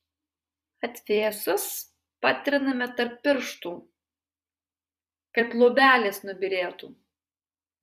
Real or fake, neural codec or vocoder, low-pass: fake; vocoder, 44.1 kHz, 128 mel bands every 256 samples, BigVGAN v2; 14.4 kHz